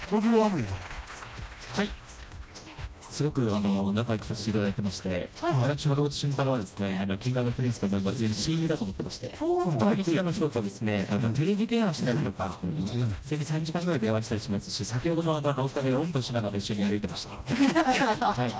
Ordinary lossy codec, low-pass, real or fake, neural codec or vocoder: none; none; fake; codec, 16 kHz, 1 kbps, FreqCodec, smaller model